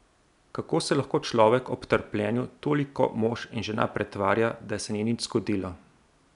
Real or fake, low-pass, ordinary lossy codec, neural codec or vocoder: real; 10.8 kHz; none; none